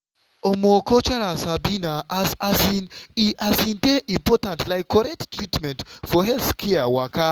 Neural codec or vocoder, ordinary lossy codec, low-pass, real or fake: autoencoder, 48 kHz, 128 numbers a frame, DAC-VAE, trained on Japanese speech; Opus, 24 kbps; 19.8 kHz; fake